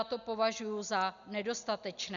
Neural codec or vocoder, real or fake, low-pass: none; real; 7.2 kHz